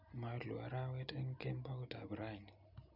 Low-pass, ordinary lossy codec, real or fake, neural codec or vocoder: 5.4 kHz; none; real; none